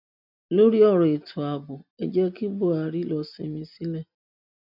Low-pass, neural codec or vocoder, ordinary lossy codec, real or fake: 5.4 kHz; none; none; real